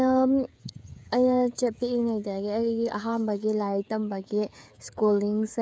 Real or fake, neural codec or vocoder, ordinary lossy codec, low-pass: fake; codec, 16 kHz, 16 kbps, FreqCodec, smaller model; none; none